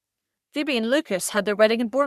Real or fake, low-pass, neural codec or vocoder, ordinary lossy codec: fake; 14.4 kHz; codec, 44.1 kHz, 3.4 kbps, Pupu-Codec; Opus, 64 kbps